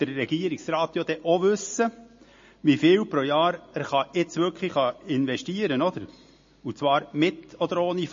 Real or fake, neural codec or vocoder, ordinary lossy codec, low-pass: real; none; MP3, 32 kbps; 7.2 kHz